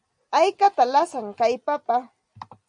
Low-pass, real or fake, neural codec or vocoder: 9.9 kHz; real; none